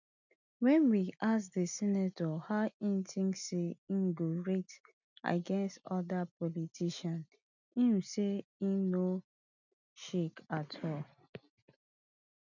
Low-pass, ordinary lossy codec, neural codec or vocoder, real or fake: 7.2 kHz; none; none; real